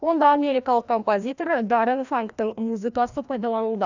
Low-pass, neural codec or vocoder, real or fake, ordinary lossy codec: 7.2 kHz; codec, 16 kHz, 1 kbps, FreqCodec, larger model; fake; none